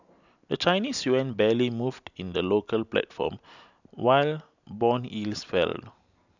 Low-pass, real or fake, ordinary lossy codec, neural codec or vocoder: 7.2 kHz; real; none; none